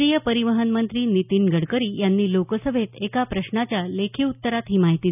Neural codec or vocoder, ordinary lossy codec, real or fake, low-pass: none; none; real; 3.6 kHz